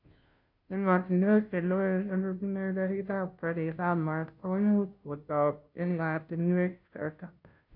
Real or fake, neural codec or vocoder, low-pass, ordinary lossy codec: fake; codec, 16 kHz, 0.5 kbps, FunCodec, trained on Chinese and English, 25 frames a second; 5.4 kHz; none